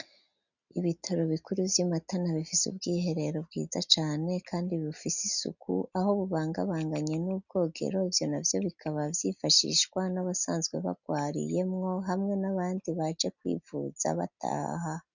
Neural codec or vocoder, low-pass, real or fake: none; 7.2 kHz; real